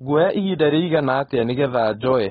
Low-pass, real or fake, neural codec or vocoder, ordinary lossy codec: 7.2 kHz; fake; codec, 16 kHz, 4.8 kbps, FACodec; AAC, 16 kbps